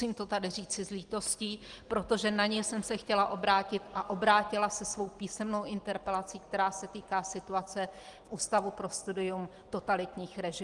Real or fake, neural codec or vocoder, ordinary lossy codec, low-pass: real; none; Opus, 24 kbps; 10.8 kHz